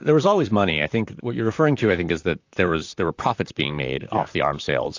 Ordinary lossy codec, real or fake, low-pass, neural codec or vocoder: AAC, 48 kbps; fake; 7.2 kHz; vocoder, 44.1 kHz, 128 mel bands, Pupu-Vocoder